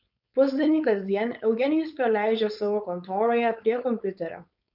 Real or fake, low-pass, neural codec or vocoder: fake; 5.4 kHz; codec, 16 kHz, 4.8 kbps, FACodec